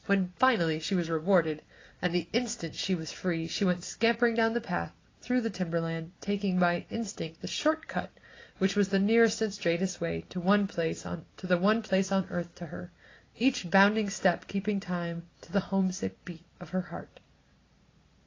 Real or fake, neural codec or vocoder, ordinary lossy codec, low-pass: real; none; AAC, 32 kbps; 7.2 kHz